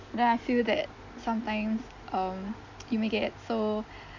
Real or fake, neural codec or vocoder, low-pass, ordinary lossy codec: real; none; 7.2 kHz; none